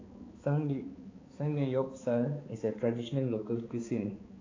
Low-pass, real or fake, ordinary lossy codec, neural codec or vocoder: 7.2 kHz; fake; AAC, 32 kbps; codec, 16 kHz, 4 kbps, X-Codec, HuBERT features, trained on balanced general audio